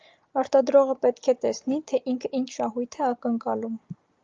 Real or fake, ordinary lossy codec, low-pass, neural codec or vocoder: real; Opus, 24 kbps; 7.2 kHz; none